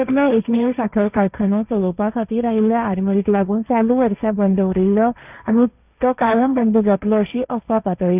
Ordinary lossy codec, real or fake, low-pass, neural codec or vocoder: none; fake; 3.6 kHz; codec, 16 kHz, 1.1 kbps, Voila-Tokenizer